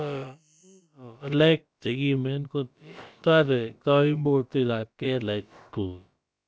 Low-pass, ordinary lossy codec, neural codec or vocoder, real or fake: none; none; codec, 16 kHz, about 1 kbps, DyCAST, with the encoder's durations; fake